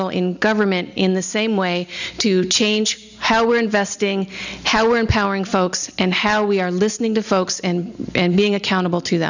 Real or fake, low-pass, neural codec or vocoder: real; 7.2 kHz; none